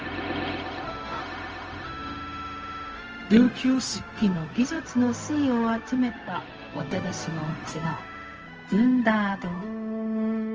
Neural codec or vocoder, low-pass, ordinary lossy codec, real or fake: codec, 16 kHz, 0.4 kbps, LongCat-Audio-Codec; 7.2 kHz; Opus, 24 kbps; fake